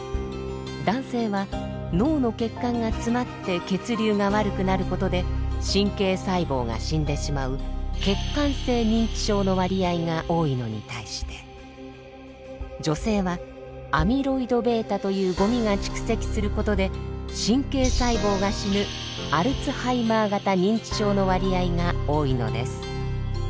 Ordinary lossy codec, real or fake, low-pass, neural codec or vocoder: none; real; none; none